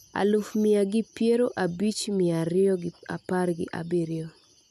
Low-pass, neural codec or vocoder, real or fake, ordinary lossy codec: 14.4 kHz; none; real; none